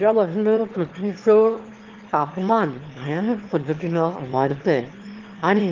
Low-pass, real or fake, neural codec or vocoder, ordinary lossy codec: 7.2 kHz; fake; autoencoder, 22.05 kHz, a latent of 192 numbers a frame, VITS, trained on one speaker; Opus, 16 kbps